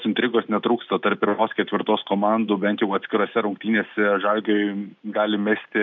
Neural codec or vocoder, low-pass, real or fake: none; 7.2 kHz; real